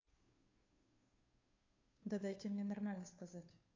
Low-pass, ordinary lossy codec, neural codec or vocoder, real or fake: 7.2 kHz; AAC, 48 kbps; codec, 16 kHz, 2 kbps, FunCodec, trained on Chinese and English, 25 frames a second; fake